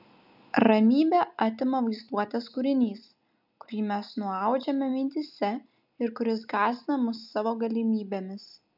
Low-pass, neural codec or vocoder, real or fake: 5.4 kHz; none; real